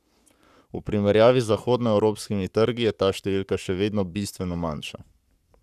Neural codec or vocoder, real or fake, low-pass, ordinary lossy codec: codec, 44.1 kHz, 7.8 kbps, Pupu-Codec; fake; 14.4 kHz; none